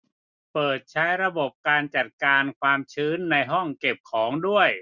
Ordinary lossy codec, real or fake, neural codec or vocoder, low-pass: none; real; none; 7.2 kHz